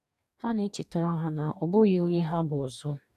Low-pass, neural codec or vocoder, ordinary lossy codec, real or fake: 14.4 kHz; codec, 44.1 kHz, 2.6 kbps, DAC; none; fake